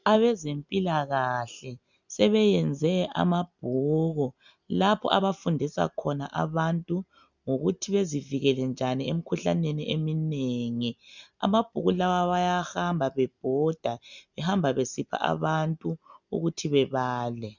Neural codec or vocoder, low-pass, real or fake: none; 7.2 kHz; real